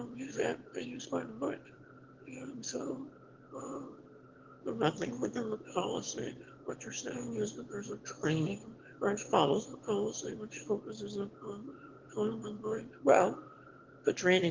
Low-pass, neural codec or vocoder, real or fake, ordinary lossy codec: 7.2 kHz; autoencoder, 22.05 kHz, a latent of 192 numbers a frame, VITS, trained on one speaker; fake; Opus, 16 kbps